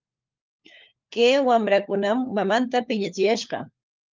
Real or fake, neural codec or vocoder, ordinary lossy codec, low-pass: fake; codec, 16 kHz, 4 kbps, FunCodec, trained on LibriTTS, 50 frames a second; Opus, 32 kbps; 7.2 kHz